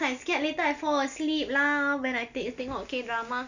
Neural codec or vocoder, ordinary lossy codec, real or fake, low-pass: none; none; real; 7.2 kHz